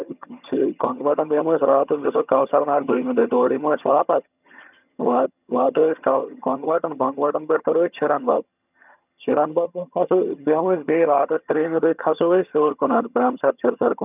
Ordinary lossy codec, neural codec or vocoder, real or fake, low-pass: none; vocoder, 22.05 kHz, 80 mel bands, HiFi-GAN; fake; 3.6 kHz